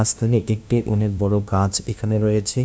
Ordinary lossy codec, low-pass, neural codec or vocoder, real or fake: none; none; codec, 16 kHz, 0.5 kbps, FunCodec, trained on LibriTTS, 25 frames a second; fake